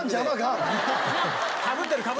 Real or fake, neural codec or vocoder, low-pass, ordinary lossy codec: real; none; none; none